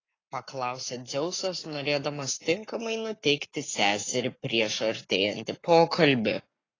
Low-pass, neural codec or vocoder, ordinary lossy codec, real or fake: 7.2 kHz; codec, 44.1 kHz, 7.8 kbps, Pupu-Codec; AAC, 32 kbps; fake